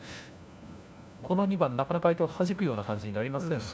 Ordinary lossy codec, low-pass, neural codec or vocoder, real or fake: none; none; codec, 16 kHz, 1 kbps, FunCodec, trained on LibriTTS, 50 frames a second; fake